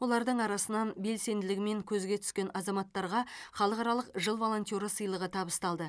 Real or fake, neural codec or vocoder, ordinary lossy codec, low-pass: real; none; none; none